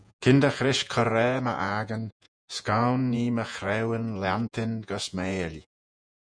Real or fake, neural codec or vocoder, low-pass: fake; vocoder, 48 kHz, 128 mel bands, Vocos; 9.9 kHz